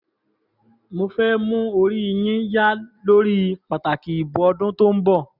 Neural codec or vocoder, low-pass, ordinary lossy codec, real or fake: none; 5.4 kHz; none; real